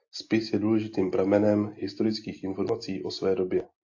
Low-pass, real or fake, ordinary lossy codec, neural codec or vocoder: 7.2 kHz; real; AAC, 48 kbps; none